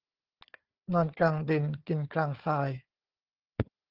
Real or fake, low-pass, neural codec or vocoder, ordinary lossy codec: fake; 5.4 kHz; codec, 16 kHz, 4 kbps, FunCodec, trained on Chinese and English, 50 frames a second; Opus, 16 kbps